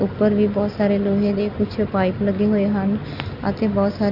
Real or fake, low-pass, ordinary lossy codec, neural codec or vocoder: real; 5.4 kHz; none; none